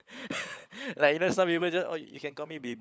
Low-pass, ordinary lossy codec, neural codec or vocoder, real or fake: none; none; codec, 16 kHz, 16 kbps, FunCodec, trained on LibriTTS, 50 frames a second; fake